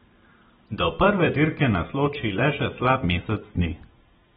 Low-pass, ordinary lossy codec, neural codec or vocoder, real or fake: 19.8 kHz; AAC, 16 kbps; vocoder, 44.1 kHz, 128 mel bands every 256 samples, BigVGAN v2; fake